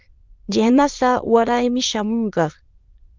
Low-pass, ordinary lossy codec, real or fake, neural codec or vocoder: 7.2 kHz; Opus, 24 kbps; fake; autoencoder, 22.05 kHz, a latent of 192 numbers a frame, VITS, trained on many speakers